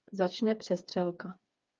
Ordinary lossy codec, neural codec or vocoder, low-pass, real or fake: Opus, 24 kbps; codec, 16 kHz, 4 kbps, FreqCodec, smaller model; 7.2 kHz; fake